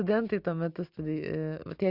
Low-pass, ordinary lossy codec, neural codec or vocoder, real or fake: 5.4 kHz; Opus, 64 kbps; none; real